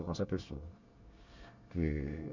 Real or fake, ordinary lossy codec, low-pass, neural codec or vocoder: fake; none; 7.2 kHz; codec, 24 kHz, 1 kbps, SNAC